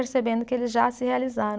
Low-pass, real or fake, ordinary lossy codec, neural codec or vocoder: none; real; none; none